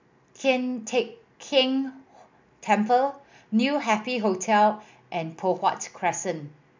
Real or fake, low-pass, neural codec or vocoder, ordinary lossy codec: real; 7.2 kHz; none; MP3, 64 kbps